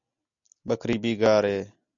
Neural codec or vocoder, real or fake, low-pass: none; real; 7.2 kHz